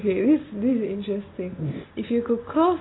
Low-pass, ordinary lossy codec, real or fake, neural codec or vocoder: 7.2 kHz; AAC, 16 kbps; real; none